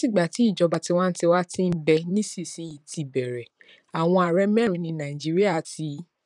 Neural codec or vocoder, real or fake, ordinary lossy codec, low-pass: vocoder, 44.1 kHz, 128 mel bands, Pupu-Vocoder; fake; none; 10.8 kHz